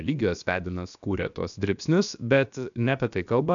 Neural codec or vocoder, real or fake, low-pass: codec, 16 kHz, about 1 kbps, DyCAST, with the encoder's durations; fake; 7.2 kHz